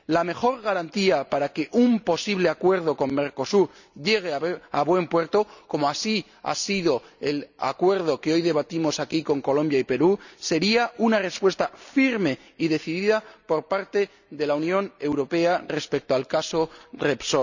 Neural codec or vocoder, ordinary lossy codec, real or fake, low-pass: none; none; real; 7.2 kHz